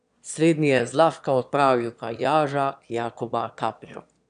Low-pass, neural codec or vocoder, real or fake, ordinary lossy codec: 9.9 kHz; autoencoder, 22.05 kHz, a latent of 192 numbers a frame, VITS, trained on one speaker; fake; none